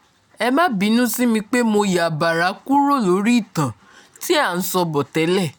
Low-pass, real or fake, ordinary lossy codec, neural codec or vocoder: none; real; none; none